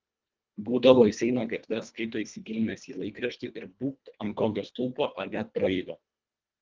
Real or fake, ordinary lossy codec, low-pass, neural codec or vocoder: fake; Opus, 24 kbps; 7.2 kHz; codec, 24 kHz, 1.5 kbps, HILCodec